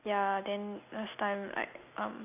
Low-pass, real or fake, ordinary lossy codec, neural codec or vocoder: 3.6 kHz; real; none; none